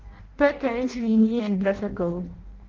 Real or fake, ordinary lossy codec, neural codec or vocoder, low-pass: fake; Opus, 16 kbps; codec, 16 kHz in and 24 kHz out, 0.6 kbps, FireRedTTS-2 codec; 7.2 kHz